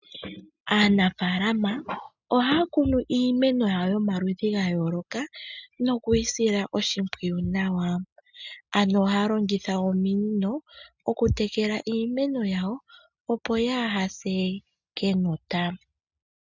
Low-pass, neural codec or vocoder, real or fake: 7.2 kHz; none; real